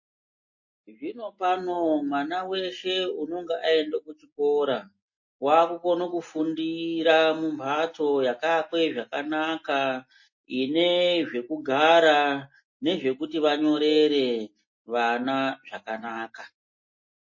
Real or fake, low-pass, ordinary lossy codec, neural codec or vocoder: real; 7.2 kHz; MP3, 32 kbps; none